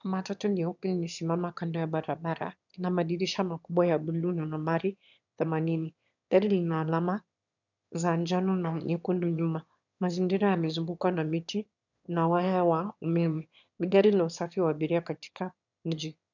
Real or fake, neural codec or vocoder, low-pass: fake; autoencoder, 22.05 kHz, a latent of 192 numbers a frame, VITS, trained on one speaker; 7.2 kHz